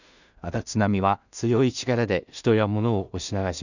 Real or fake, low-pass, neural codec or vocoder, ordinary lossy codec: fake; 7.2 kHz; codec, 16 kHz in and 24 kHz out, 0.4 kbps, LongCat-Audio-Codec, two codebook decoder; none